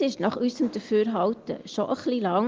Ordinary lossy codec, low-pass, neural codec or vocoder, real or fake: Opus, 32 kbps; 7.2 kHz; none; real